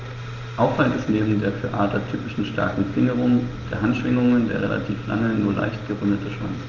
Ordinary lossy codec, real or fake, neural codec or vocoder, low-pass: Opus, 32 kbps; real; none; 7.2 kHz